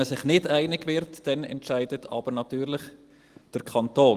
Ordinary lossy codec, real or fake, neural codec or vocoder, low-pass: Opus, 24 kbps; real; none; 14.4 kHz